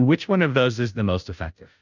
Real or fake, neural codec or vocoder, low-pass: fake; codec, 16 kHz, 0.5 kbps, FunCodec, trained on Chinese and English, 25 frames a second; 7.2 kHz